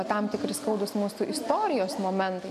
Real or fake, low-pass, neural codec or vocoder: real; 14.4 kHz; none